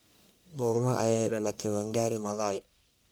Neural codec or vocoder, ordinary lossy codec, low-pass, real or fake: codec, 44.1 kHz, 1.7 kbps, Pupu-Codec; none; none; fake